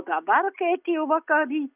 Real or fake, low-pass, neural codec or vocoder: real; 3.6 kHz; none